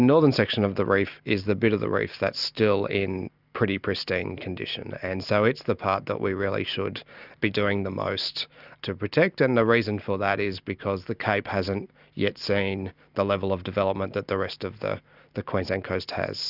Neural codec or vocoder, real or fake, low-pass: none; real; 5.4 kHz